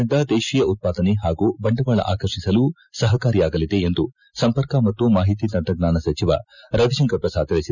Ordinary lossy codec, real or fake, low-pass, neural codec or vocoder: none; real; 7.2 kHz; none